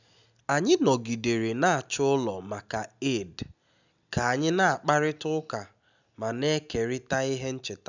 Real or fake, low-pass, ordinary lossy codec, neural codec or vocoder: real; 7.2 kHz; none; none